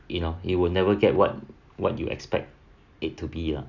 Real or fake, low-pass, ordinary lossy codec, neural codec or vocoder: real; 7.2 kHz; none; none